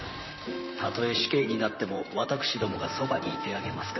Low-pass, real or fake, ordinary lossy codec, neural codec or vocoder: 7.2 kHz; fake; MP3, 24 kbps; vocoder, 44.1 kHz, 128 mel bands, Pupu-Vocoder